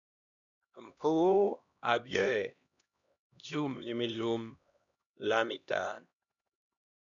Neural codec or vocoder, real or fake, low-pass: codec, 16 kHz, 1 kbps, X-Codec, HuBERT features, trained on LibriSpeech; fake; 7.2 kHz